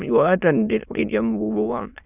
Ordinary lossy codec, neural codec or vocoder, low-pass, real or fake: none; autoencoder, 22.05 kHz, a latent of 192 numbers a frame, VITS, trained on many speakers; 3.6 kHz; fake